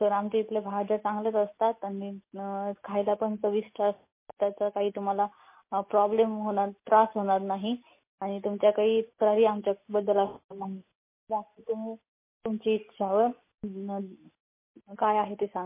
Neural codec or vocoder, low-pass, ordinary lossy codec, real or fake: none; 3.6 kHz; MP3, 24 kbps; real